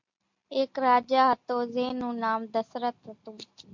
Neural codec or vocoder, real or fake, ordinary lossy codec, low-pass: none; real; MP3, 48 kbps; 7.2 kHz